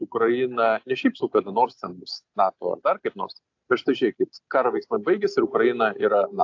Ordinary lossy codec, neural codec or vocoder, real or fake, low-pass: AAC, 48 kbps; none; real; 7.2 kHz